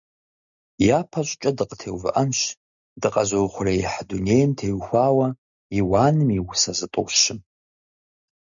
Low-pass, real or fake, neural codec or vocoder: 7.2 kHz; real; none